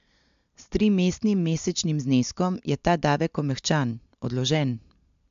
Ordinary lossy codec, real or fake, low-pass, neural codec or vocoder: MP3, 64 kbps; real; 7.2 kHz; none